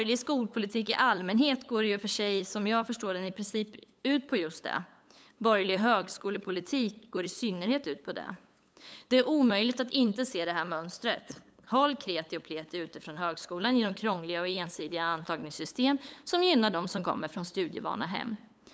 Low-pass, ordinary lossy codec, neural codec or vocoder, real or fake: none; none; codec, 16 kHz, 8 kbps, FunCodec, trained on LibriTTS, 25 frames a second; fake